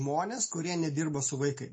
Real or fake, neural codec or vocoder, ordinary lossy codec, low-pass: real; none; MP3, 32 kbps; 9.9 kHz